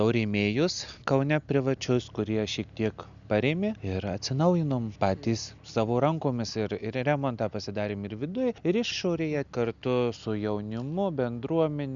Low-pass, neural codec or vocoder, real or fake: 7.2 kHz; none; real